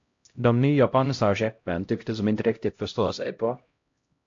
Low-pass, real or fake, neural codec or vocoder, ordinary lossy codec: 7.2 kHz; fake; codec, 16 kHz, 0.5 kbps, X-Codec, HuBERT features, trained on LibriSpeech; AAC, 48 kbps